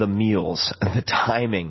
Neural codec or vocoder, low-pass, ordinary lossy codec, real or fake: none; 7.2 kHz; MP3, 24 kbps; real